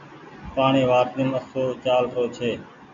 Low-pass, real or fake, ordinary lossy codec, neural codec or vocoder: 7.2 kHz; real; AAC, 64 kbps; none